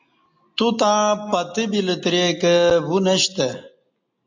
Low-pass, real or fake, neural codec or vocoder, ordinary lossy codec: 7.2 kHz; real; none; MP3, 48 kbps